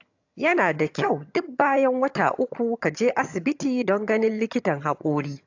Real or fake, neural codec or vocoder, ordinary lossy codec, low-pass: fake; vocoder, 22.05 kHz, 80 mel bands, HiFi-GAN; AAC, 48 kbps; 7.2 kHz